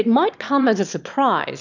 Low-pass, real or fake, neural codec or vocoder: 7.2 kHz; fake; autoencoder, 22.05 kHz, a latent of 192 numbers a frame, VITS, trained on one speaker